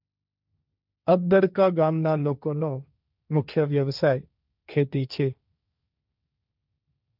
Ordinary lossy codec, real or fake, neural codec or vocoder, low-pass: none; fake; codec, 16 kHz, 1.1 kbps, Voila-Tokenizer; 5.4 kHz